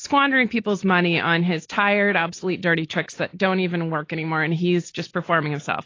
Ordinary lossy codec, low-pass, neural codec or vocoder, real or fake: AAC, 32 kbps; 7.2 kHz; none; real